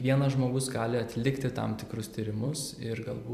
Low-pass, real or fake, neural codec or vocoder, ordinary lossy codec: 14.4 kHz; fake; vocoder, 44.1 kHz, 128 mel bands every 256 samples, BigVGAN v2; MP3, 96 kbps